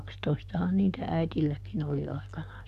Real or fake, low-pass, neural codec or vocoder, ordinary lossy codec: fake; 14.4 kHz; codec, 44.1 kHz, 7.8 kbps, DAC; none